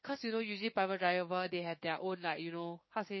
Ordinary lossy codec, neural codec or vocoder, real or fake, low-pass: MP3, 24 kbps; codec, 16 kHz, 6 kbps, DAC; fake; 7.2 kHz